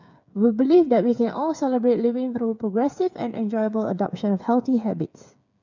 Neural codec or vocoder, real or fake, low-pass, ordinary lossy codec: codec, 16 kHz, 8 kbps, FreqCodec, smaller model; fake; 7.2 kHz; none